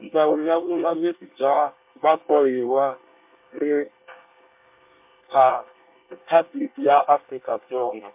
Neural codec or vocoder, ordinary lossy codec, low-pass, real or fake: codec, 24 kHz, 1 kbps, SNAC; MP3, 32 kbps; 3.6 kHz; fake